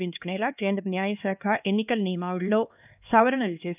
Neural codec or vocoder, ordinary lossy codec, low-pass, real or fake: codec, 16 kHz, 2 kbps, X-Codec, HuBERT features, trained on LibriSpeech; none; 3.6 kHz; fake